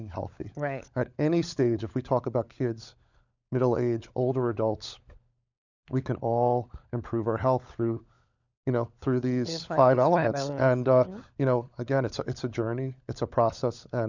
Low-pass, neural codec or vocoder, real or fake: 7.2 kHz; codec, 16 kHz, 16 kbps, FunCodec, trained on LibriTTS, 50 frames a second; fake